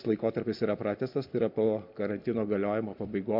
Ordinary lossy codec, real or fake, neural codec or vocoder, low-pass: MP3, 48 kbps; real; none; 5.4 kHz